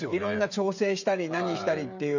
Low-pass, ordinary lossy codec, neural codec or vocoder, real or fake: 7.2 kHz; none; codec, 16 kHz, 16 kbps, FreqCodec, smaller model; fake